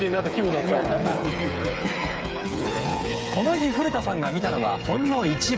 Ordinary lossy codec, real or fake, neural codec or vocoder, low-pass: none; fake; codec, 16 kHz, 8 kbps, FreqCodec, smaller model; none